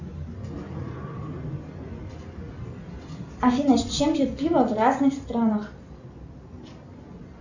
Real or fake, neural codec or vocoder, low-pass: real; none; 7.2 kHz